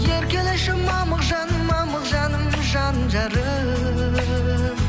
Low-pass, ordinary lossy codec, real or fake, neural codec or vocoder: none; none; real; none